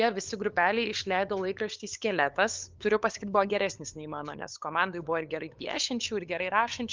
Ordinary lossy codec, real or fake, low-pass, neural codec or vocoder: Opus, 24 kbps; fake; 7.2 kHz; codec, 16 kHz, 8 kbps, FunCodec, trained on LibriTTS, 25 frames a second